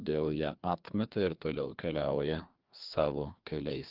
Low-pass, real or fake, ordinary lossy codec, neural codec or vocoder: 5.4 kHz; fake; Opus, 32 kbps; codec, 16 kHz, 2 kbps, FreqCodec, larger model